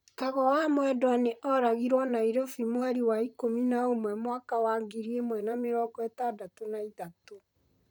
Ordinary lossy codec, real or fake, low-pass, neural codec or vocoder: none; fake; none; vocoder, 44.1 kHz, 128 mel bands, Pupu-Vocoder